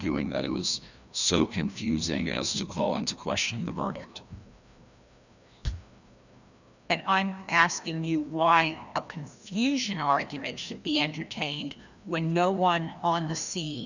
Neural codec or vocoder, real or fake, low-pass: codec, 16 kHz, 1 kbps, FreqCodec, larger model; fake; 7.2 kHz